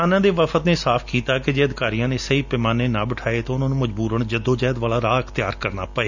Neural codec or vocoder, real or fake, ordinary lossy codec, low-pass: none; real; none; 7.2 kHz